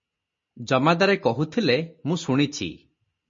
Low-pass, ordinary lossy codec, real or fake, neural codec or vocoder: 7.2 kHz; MP3, 32 kbps; real; none